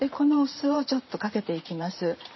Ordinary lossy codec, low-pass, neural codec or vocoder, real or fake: MP3, 24 kbps; 7.2 kHz; vocoder, 44.1 kHz, 128 mel bands every 512 samples, BigVGAN v2; fake